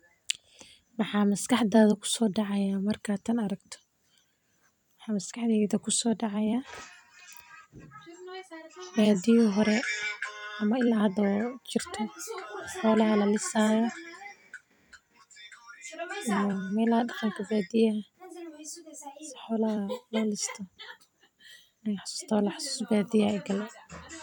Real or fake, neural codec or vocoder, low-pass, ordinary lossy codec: fake; vocoder, 44.1 kHz, 128 mel bands every 256 samples, BigVGAN v2; 19.8 kHz; none